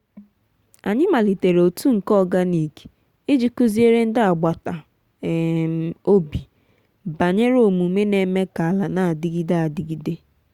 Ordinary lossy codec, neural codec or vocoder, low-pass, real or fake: Opus, 64 kbps; none; 19.8 kHz; real